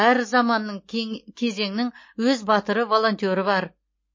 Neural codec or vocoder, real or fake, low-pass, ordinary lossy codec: none; real; 7.2 kHz; MP3, 32 kbps